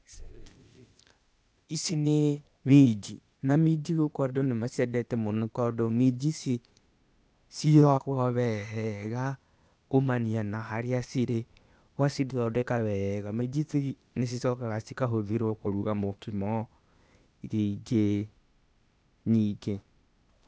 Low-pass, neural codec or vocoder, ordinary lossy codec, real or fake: none; codec, 16 kHz, 0.8 kbps, ZipCodec; none; fake